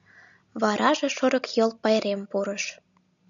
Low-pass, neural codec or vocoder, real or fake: 7.2 kHz; none; real